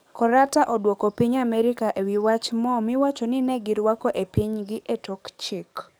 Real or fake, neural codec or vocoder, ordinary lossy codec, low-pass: fake; codec, 44.1 kHz, 7.8 kbps, DAC; none; none